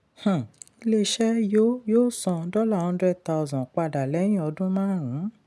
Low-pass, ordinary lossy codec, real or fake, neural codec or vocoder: none; none; real; none